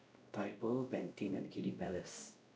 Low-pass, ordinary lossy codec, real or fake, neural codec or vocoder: none; none; fake; codec, 16 kHz, 0.5 kbps, X-Codec, WavLM features, trained on Multilingual LibriSpeech